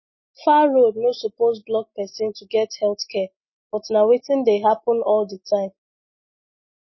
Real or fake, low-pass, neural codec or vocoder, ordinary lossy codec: real; 7.2 kHz; none; MP3, 24 kbps